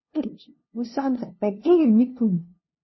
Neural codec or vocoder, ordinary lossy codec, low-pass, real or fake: codec, 16 kHz, 0.5 kbps, FunCodec, trained on LibriTTS, 25 frames a second; MP3, 24 kbps; 7.2 kHz; fake